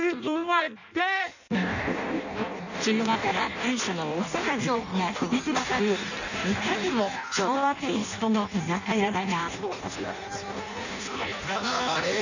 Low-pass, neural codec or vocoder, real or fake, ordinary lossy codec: 7.2 kHz; codec, 16 kHz in and 24 kHz out, 0.6 kbps, FireRedTTS-2 codec; fake; none